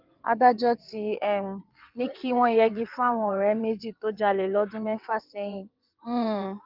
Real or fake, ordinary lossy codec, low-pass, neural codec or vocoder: fake; Opus, 24 kbps; 5.4 kHz; vocoder, 22.05 kHz, 80 mel bands, WaveNeXt